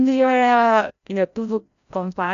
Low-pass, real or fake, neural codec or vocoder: 7.2 kHz; fake; codec, 16 kHz, 0.5 kbps, FreqCodec, larger model